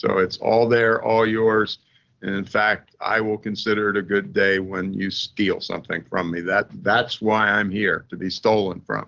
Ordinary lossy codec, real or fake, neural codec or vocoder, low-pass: Opus, 16 kbps; real; none; 7.2 kHz